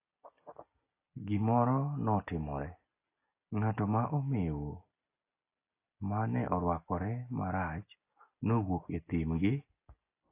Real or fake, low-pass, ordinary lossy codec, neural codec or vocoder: real; 3.6 kHz; AAC, 24 kbps; none